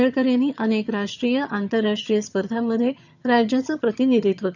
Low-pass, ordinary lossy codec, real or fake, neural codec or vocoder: 7.2 kHz; none; fake; vocoder, 22.05 kHz, 80 mel bands, HiFi-GAN